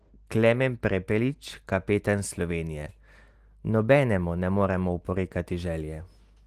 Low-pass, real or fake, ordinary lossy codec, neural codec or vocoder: 14.4 kHz; real; Opus, 24 kbps; none